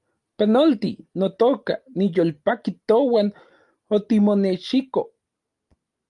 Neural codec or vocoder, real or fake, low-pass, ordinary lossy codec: none; real; 10.8 kHz; Opus, 32 kbps